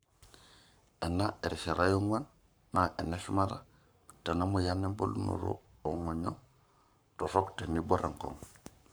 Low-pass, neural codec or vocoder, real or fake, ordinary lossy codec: none; codec, 44.1 kHz, 7.8 kbps, Pupu-Codec; fake; none